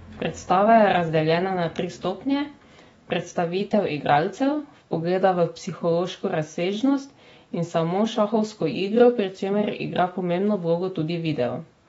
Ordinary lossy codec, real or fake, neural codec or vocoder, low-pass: AAC, 24 kbps; fake; autoencoder, 48 kHz, 128 numbers a frame, DAC-VAE, trained on Japanese speech; 19.8 kHz